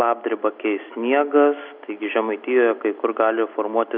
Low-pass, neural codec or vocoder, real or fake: 5.4 kHz; none; real